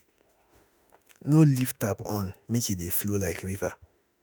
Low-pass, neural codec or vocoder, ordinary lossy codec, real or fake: none; autoencoder, 48 kHz, 32 numbers a frame, DAC-VAE, trained on Japanese speech; none; fake